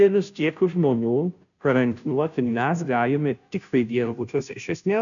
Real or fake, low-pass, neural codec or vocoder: fake; 7.2 kHz; codec, 16 kHz, 0.5 kbps, FunCodec, trained on Chinese and English, 25 frames a second